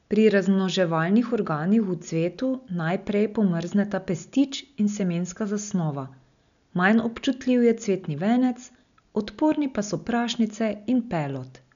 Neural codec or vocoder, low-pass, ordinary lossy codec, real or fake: none; 7.2 kHz; none; real